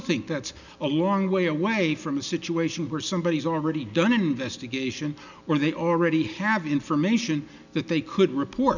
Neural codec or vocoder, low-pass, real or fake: none; 7.2 kHz; real